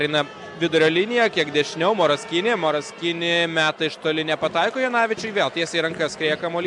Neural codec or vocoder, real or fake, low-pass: none; real; 10.8 kHz